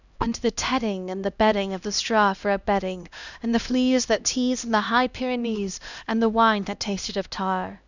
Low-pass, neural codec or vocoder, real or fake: 7.2 kHz; codec, 16 kHz, 1 kbps, X-Codec, HuBERT features, trained on LibriSpeech; fake